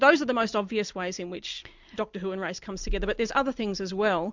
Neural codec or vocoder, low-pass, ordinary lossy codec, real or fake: none; 7.2 kHz; MP3, 64 kbps; real